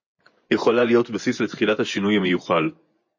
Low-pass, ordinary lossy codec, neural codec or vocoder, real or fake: 7.2 kHz; MP3, 32 kbps; vocoder, 24 kHz, 100 mel bands, Vocos; fake